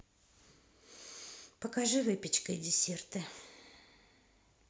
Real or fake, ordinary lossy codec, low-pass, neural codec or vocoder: real; none; none; none